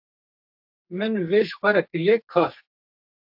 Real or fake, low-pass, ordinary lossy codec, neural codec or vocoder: fake; 5.4 kHz; AAC, 48 kbps; codec, 44.1 kHz, 2.6 kbps, SNAC